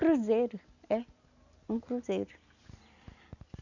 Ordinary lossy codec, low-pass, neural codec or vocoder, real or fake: none; 7.2 kHz; none; real